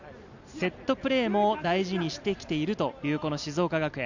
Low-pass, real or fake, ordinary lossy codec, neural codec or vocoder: 7.2 kHz; real; none; none